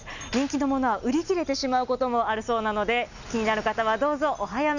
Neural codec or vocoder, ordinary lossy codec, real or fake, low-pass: none; none; real; 7.2 kHz